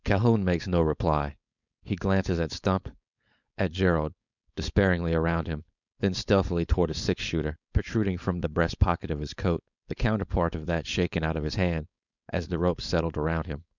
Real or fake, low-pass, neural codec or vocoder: fake; 7.2 kHz; codec, 16 kHz, 4.8 kbps, FACodec